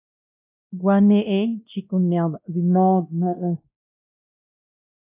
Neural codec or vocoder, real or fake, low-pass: codec, 16 kHz, 1 kbps, X-Codec, WavLM features, trained on Multilingual LibriSpeech; fake; 3.6 kHz